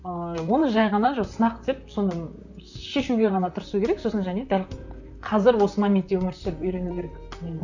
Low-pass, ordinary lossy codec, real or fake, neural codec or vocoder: 7.2 kHz; none; fake; vocoder, 44.1 kHz, 128 mel bands, Pupu-Vocoder